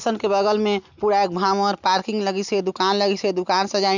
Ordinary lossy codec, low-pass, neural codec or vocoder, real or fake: none; 7.2 kHz; none; real